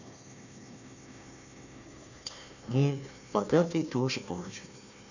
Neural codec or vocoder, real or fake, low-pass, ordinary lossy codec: codec, 16 kHz, 1 kbps, FunCodec, trained on Chinese and English, 50 frames a second; fake; 7.2 kHz; none